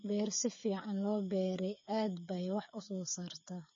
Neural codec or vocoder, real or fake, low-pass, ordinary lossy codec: codec, 16 kHz, 8 kbps, FreqCodec, smaller model; fake; 7.2 kHz; MP3, 32 kbps